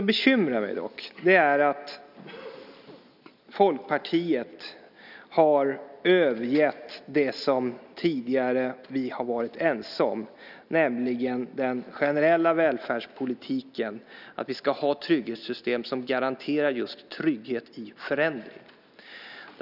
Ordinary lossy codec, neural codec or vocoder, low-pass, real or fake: none; none; 5.4 kHz; real